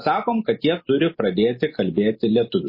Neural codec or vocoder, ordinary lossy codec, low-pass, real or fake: none; MP3, 24 kbps; 5.4 kHz; real